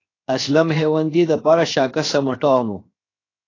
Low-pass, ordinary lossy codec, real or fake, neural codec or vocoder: 7.2 kHz; AAC, 32 kbps; fake; codec, 16 kHz, 0.7 kbps, FocalCodec